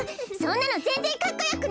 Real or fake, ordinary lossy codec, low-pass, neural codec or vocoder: real; none; none; none